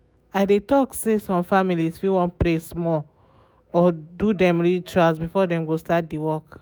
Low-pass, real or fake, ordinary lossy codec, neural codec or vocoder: none; fake; none; autoencoder, 48 kHz, 128 numbers a frame, DAC-VAE, trained on Japanese speech